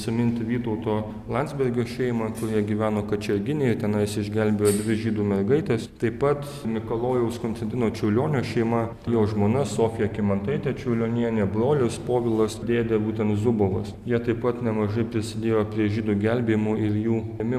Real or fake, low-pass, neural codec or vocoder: real; 14.4 kHz; none